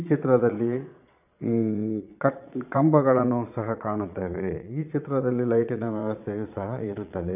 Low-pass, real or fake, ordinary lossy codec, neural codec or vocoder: 3.6 kHz; fake; none; vocoder, 22.05 kHz, 80 mel bands, Vocos